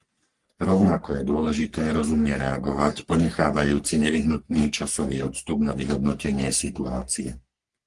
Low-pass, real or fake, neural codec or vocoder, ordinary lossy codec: 10.8 kHz; fake; codec, 44.1 kHz, 3.4 kbps, Pupu-Codec; Opus, 24 kbps